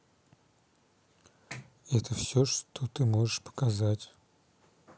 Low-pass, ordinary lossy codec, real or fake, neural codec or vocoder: none; none; real; none